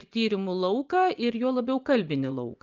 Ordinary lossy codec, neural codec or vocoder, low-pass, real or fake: Opus, 24 kbps; vocoder, 24 kHz, 100 mel bands, Vocos; 7.2 kHz; fake